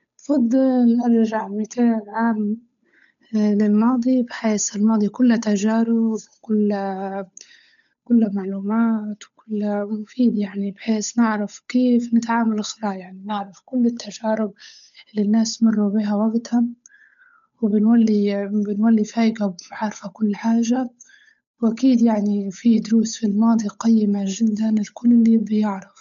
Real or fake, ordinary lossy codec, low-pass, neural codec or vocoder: fake; none; 7.2 kHz; codec, 16 kHz, 8 kbps, FunCodec, trained on Chinese and English, 25 frames a second